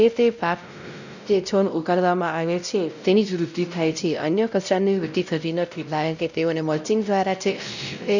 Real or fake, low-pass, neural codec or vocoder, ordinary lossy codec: fake; 7.2 kHz; codec, 16 kHz, 0.5 kbps, X-Codec, WavLM features, trained on Multilingual LibriSpeech; none